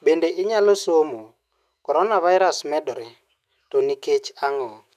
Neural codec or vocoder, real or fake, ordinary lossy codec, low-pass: autoencoder, 48 kHz, 128 numbers a frame, DAC-VAE, trained on Japanese speech; fake; none; 14.4 kHz